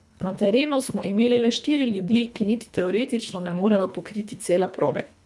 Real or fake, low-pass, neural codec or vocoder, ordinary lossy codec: fake; none; codec, 24 kHz, 1.5 kbps, HILCodec; none